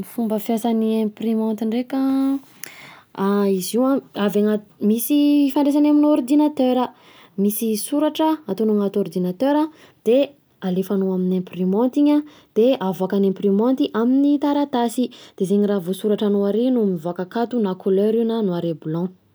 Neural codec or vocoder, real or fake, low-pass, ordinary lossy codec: none; real; none; none